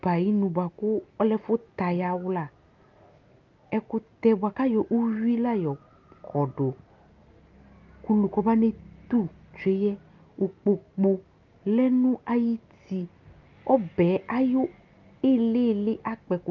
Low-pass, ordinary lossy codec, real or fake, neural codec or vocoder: 7.2 kHz; Opus, 32 kbps; real; none